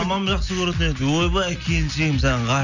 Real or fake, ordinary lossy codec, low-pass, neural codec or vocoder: real; none; 7.2 kHz; none